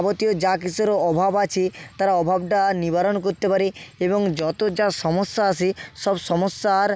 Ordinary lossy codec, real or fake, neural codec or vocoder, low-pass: none; real; none; none